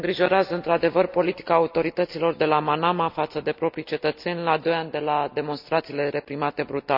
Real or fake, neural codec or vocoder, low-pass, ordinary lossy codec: real; none; 5.4 kHz; none